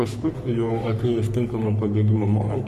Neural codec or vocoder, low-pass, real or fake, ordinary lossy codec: codec, 44.1 kHz, 3.4 kbps, Pupu-Codec; 14.4 kHz; fake; MP3, 64 kbps